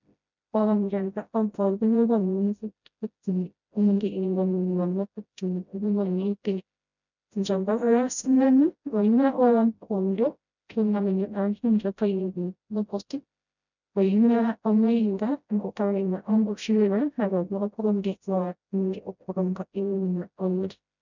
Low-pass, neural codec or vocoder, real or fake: 7.2 kHz; codec, 16 kHz, 0.5 kbps, FreqCodec, smaller model; fake